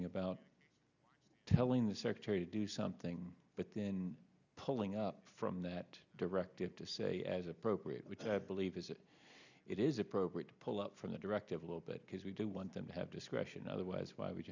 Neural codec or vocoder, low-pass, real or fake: none; 7.2 kHz; real